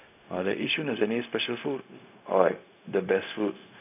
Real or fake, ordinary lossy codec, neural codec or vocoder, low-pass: fake; none; codec, 16 kHz, 0.4 kbps, LongCat-Audio-Codec; 3.6 kHz